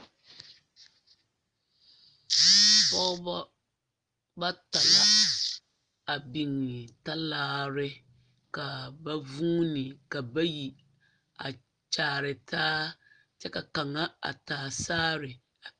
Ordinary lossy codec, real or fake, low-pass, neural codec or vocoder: Opus, 32 kbps; real; 9.9 kHz; none